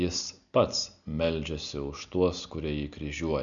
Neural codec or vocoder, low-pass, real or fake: none; 7.2 kHz; real